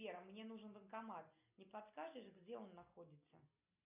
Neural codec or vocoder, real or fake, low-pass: none; real; 3.6 kHz